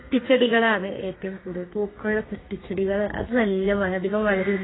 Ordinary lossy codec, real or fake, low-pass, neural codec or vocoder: AAC, 16 kbps; fake; 7.2 kHz; codec, 32 kHz, 1.9 kbps, SNAC